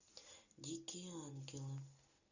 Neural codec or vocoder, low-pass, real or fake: none; 7.2 kHz; real